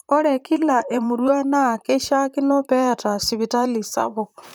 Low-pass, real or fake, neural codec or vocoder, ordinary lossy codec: none; fake; vocoder, 44.1 kHz, 128 mel bands, Pupu-Vocoder; none